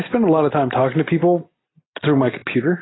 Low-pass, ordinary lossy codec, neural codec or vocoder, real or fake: 7.2 kHz; AAC, 16 kbps; none; real